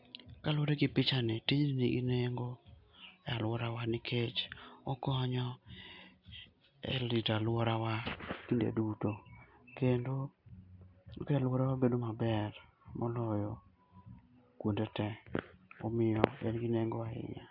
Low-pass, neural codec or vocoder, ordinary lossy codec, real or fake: 5.4 kHz; none; AAC, 48 kbps; real